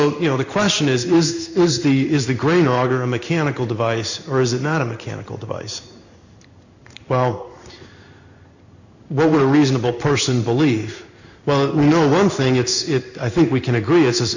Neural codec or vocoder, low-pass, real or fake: codec, 16 kHz in and 24 kHz out, 1 kbps, XY-Tokenizer; 7.2 kHz; fake